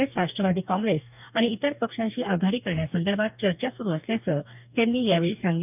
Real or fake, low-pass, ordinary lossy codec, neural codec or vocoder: fake; 3.6 kHz; none; codec, 44.1 kHz, 2.6 kbps, DAC